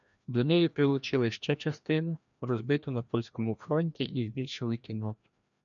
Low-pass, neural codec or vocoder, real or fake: 7.2 kHz; codec, 16 kHz, 1 kbps, FreqCodec, larger model; fake